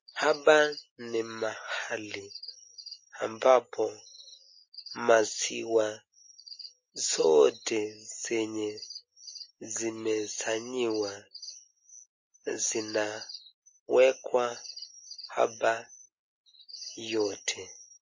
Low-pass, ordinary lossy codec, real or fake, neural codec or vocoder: 7.2 kHz; MP3, 32 kbps; real; none